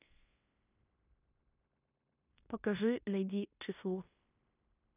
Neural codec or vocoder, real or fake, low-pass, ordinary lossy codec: codec, 16 kHz in and 24 kHz out, 0.9 kbps, LongCat-Audio-Codec, fine tuned four codebook decoder; fake; 3.6 kHz; none